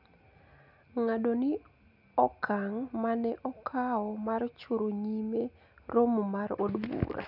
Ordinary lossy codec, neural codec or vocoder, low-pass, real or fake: none; none; 5.4 kHz; real